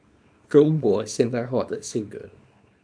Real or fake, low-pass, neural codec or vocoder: fake; 9.9 kHz; codec, 24 kHz, 0.9 kbps, WavTokenizer, small release